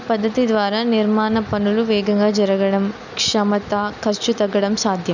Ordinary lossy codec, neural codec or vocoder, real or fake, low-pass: none; none; real; 7.2 kHz